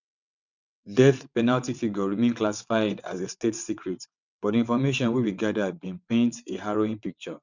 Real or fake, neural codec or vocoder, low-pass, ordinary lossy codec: fake; vocoder, 22.05 kHz, 80 mel bands, WaveNeXt; 7.2 kHz; none